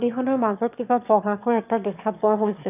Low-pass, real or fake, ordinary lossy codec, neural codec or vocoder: 3.6 kHz; fake; none; autoencoder, 22.05 kHz, a latent of 192 numbers a frame, VITS, trained on one speaker